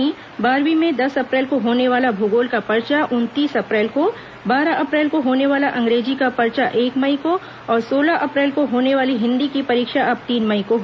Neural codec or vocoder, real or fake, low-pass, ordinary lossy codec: none; real; none; none